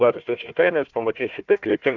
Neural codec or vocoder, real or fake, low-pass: codec, 16 kHz, 1 kbps, FunCodec, trained on Chinese and English, 50 frames a second; fake; 7.2 kHz